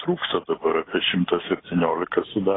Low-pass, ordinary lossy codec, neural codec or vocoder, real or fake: 7.2 kHz; AAC, 16 kbps; none; real